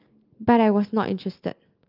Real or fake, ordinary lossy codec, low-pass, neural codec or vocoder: real; Opus, 24 kbps; 5.4 kHz; none